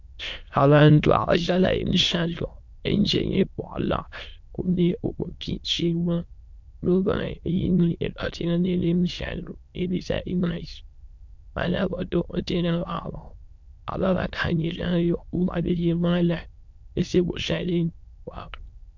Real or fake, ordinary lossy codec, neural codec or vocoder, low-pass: fake; MP3, 64 kbps; autoencoder, 22.05 kHz, a latent of 192 numbers a frame, VITS, trained on many speakers; 7.2 kHz